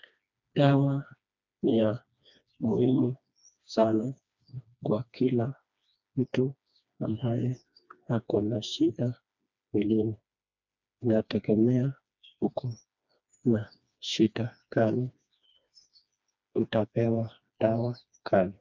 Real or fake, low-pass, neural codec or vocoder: fake; 7.2 kHz; codec, 16 kHz, 2 kbps, FreqCodec, smaller model